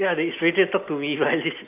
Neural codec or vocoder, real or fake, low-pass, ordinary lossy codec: none; real; 3.6 kHz; AAC, 32 kbps